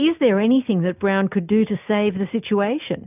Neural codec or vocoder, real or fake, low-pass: none; real; 3.6 kHz